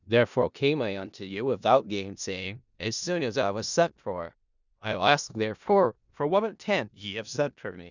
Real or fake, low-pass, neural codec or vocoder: fake; 7.2 kHz; codec, 16 kHz in and 24 kHz out, 0.4 kbps, LongCat-Audio-Codec, four codebook decoder